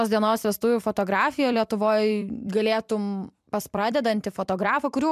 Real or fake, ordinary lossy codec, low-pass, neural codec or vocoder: fake; MP3, 96 kbps; 14.4 kHz; vocoder, 44.1 kHz, 128 mel bands every 256 samples, BigVGAN v2